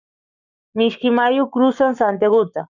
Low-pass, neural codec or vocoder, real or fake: 7.2 kHz; codec, 44.1 kHz, 7.8 kbps, Pupu-Codec; fake